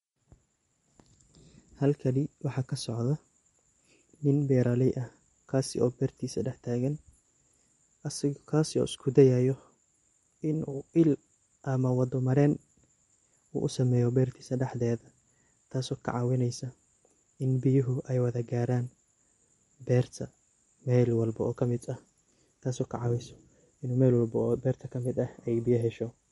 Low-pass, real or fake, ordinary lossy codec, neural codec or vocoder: 19.8 kHz; real; MP3, 48 kbps; none